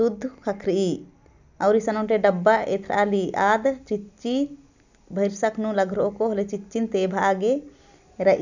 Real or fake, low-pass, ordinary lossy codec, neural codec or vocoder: real; 7.2 kHz; none; none